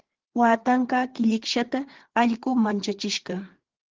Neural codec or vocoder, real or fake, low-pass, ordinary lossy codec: codec, 16 kHz, 4 kbps, FreqCodec, larger model; fake; 7.2 kHz; Opus, 16 kbps